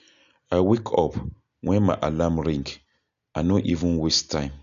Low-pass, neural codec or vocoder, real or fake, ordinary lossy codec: 7.2 kHz; none; real; none